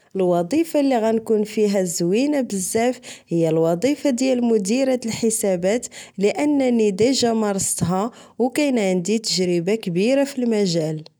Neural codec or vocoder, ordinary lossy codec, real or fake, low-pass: none; none; real; none